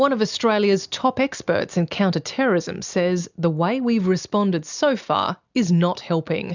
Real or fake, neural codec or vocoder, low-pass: real; none; 7.2 kHz